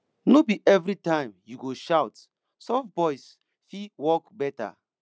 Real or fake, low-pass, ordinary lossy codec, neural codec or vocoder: real; none; none; none